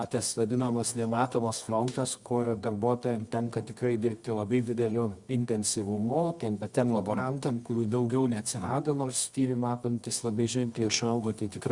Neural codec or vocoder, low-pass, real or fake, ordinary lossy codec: codec, 24 kHz, 0.9 kbps, WavTokenizer, medium music audio release; 10.8 kHz; fake; Opus, 64 kbps